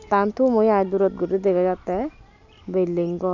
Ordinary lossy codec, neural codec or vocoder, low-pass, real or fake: none; none; 7.2 kHz; real